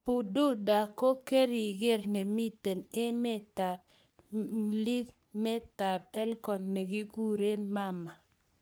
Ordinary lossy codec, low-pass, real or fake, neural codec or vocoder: none; none; fake; codec, 44.1 kHz, 3.4 kbps, Pupu-Codec